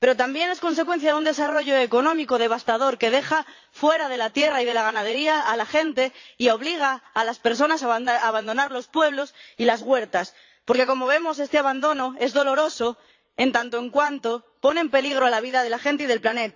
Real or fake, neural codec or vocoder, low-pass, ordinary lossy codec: fake; vocoder, 22.05 kHz, 80 mel bands, Vocos; 7.2 kHz; AAC, 48 kbps